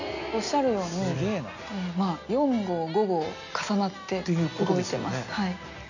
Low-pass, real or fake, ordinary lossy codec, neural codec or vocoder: 7.2 kHz; real; none; none